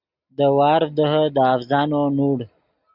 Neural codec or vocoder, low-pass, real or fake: none; 5.4 kHz; real